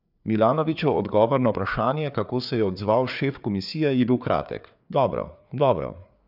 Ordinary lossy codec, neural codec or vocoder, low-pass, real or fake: none; codec, 16 kHz, 4 kbps, FreqCodec, larger model; 5.4 kHz; fake